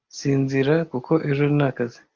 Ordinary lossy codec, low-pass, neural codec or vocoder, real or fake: Opus, 32 kbps; 7.2 kHz; none; real